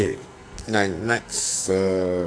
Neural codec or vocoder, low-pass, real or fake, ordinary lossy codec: codec, 44.1 kHz, 2.6 kbps, SNAC; 9.9 kHz; fake; none